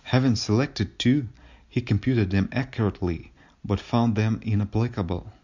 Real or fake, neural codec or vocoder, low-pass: real; none; 7.2 kHz